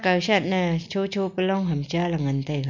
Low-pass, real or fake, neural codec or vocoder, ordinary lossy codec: 7.2 kHz; real; none; MP3, 48 kbps